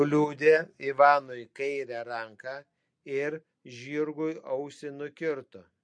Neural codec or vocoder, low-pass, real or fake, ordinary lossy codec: none; 9.9 kHz; real; MP3, 48 kbps